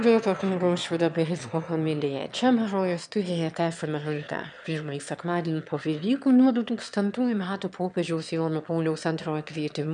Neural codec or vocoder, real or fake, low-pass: autoencoder, 22.05 kHz, a latent of 192 numbers a frame, VITS, trained on one speaker; fake; 9.9 kHz